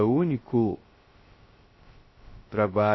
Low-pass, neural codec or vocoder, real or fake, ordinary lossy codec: 7.2 kHz; codec, 16 kHz, 0.2 kbps, FocalCodec; fake; MP3, 24 kbps